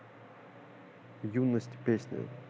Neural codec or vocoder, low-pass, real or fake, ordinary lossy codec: none; none; real; none